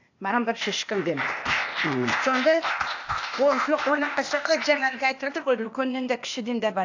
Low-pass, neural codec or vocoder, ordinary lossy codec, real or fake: 7.2 kHz; codec, 16 kHz, 0.8 kbps, ZipCodec; AAC, 48 kbps; fake